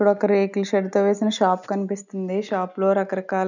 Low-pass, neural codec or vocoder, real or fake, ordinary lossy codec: 7.2 kHz; none; real; none